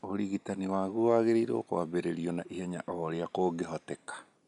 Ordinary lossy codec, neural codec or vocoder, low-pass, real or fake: none; none; 10.8 kHz; real